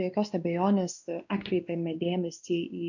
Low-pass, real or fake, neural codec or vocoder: 7.2 kHz; fake; codec, 16 kHz, 2 kbps, X-Codec, WavLM features, trained on Multilingual LibriSpeech